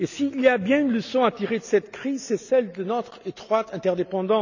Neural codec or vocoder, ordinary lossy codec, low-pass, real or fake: none; none; 7.2 kHz; real